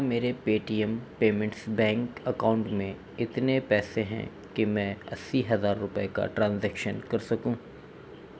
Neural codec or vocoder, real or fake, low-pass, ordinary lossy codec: none; real; none; none